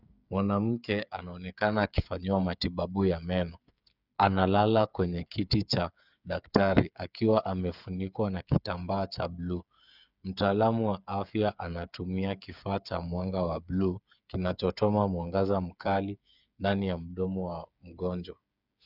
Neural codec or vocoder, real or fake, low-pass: codec, 16 kHz, 8 kbps, FreqCodec, smaller model; fake; 5.4 kHz